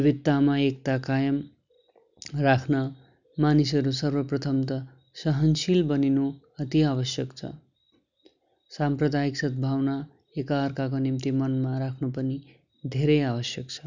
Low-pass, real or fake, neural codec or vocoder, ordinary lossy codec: 7.2 kHz; real; none; none